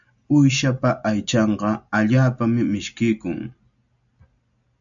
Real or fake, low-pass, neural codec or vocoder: real; 7.2 kHz; none